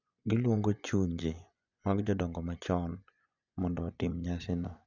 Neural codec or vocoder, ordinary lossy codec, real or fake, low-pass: vocoder, 24 kHz, 100 mel bands, Vocos; none; fake; 7.2 kHz